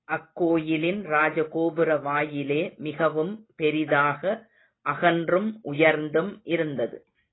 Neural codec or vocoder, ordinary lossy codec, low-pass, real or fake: none; AAC, 16 kbps; 7.2 kHz; real